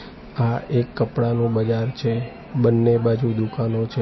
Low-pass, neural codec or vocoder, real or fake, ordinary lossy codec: 7.2 kHz; none; real; MP3, 24 kbps